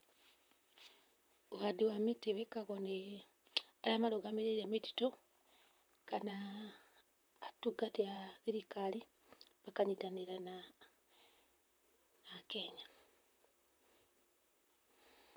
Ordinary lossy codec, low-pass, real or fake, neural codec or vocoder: none; none; fake; vocoder, 44.1 kHz, 128 mel bands, Pupu-Vocoder